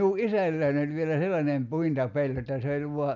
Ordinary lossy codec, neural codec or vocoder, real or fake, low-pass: none; none; real; 7.2 kHz